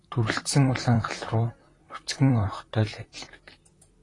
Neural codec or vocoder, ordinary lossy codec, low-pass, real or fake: vocoder, 44.1 kHz, 128 mel bands, Pupu-Vocoder; AAC, 32 kbps; 10.8 kHz; fake